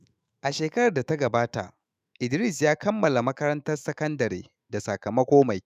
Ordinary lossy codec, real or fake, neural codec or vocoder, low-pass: none; fake; autoencoder, 48 kHz, 128 numbers a frame, DAC-VAE, trained on Japanese speech; 14.4 kHz